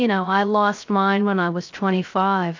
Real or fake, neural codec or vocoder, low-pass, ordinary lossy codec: fake; codec, 16 kHz, 0.3 kbps, FocalCodec; 7.2 kHz; AAC, 48 kbps